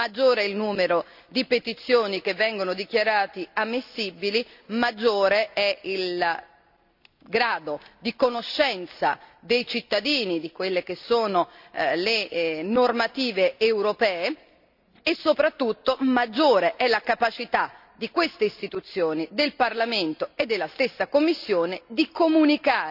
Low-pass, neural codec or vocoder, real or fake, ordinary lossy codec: 5.4 kHz; none; real; none